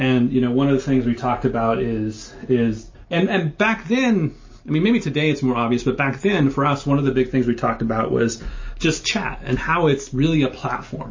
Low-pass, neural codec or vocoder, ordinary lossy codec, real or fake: 7.2 kHz; none; MP3, 32 kbps; real